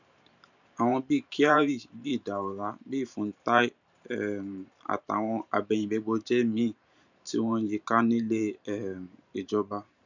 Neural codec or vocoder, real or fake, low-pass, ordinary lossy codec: vocoder, 44.1 kHz, 128 mel bands every 512 samples, BigVGAN v2; fake; 7.2 kHz; none